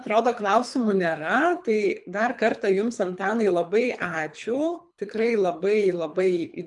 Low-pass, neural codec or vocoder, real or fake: 10.8 kHz; codec, 24 kHz, 3 kbps, HILCodec; fake